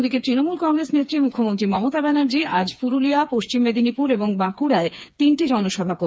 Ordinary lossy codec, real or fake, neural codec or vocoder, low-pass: none; fake; codec, 16 kHz, 4 kbps, FreqCodec, smaller model; none